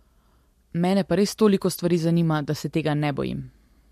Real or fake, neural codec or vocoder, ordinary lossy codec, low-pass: real; none; MP3, 64 kbps; 14.4 kHz